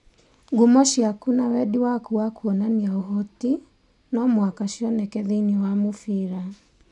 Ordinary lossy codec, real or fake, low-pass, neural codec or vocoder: none; real; 10.8 kHz; none